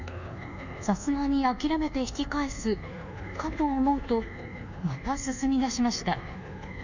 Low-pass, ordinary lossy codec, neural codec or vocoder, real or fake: 7.2 kHz; none; codec, 24 kHz, 1.2 kbps, DualCodec; fake